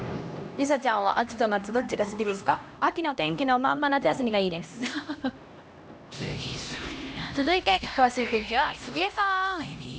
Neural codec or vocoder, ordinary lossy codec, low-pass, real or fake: codec, 16 kHz, 1 kbps, X-Codec, HuBERT features, trained on LibriSpeech; none; none; fake